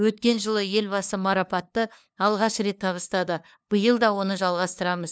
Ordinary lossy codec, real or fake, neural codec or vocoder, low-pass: none; fake; codec, 16 kHz, 2 kbps, FunCodec, trained on LibriTTS, 25 frames a second; none